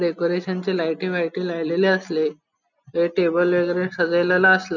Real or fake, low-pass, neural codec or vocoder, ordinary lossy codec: real; 7.2 kHz; none; none